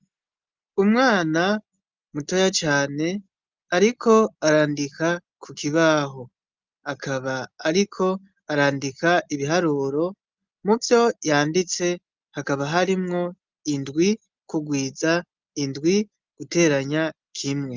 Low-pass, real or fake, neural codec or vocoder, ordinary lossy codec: 7.2 kHz; real; none; Opus, 32 kbps